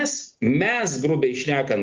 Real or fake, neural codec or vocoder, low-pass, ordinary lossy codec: real; none; 7.2 kHz; Opus, 32 kbps